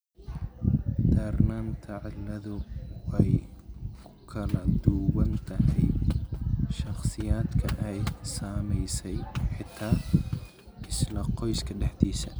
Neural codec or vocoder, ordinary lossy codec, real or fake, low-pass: none; none; real; none